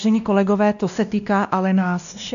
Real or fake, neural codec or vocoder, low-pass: fake; codec, 16 kHz, 1 kbps, X-Codec, WavLM features, trained on Multilingual LibriSpeech; 7.2 kHz